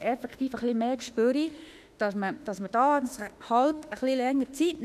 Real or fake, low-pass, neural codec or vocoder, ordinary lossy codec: fake; 14.4 kHz; autoencoder, 48 kHz, 32 numbers a frame, DAC-VAE, trained on Japanese speech; none